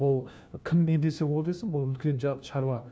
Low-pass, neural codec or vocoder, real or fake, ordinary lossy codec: none; codec, 16 kHz, 1 kbps, FunCodec, trained on LibriTTS, 50 frames a second; fake; none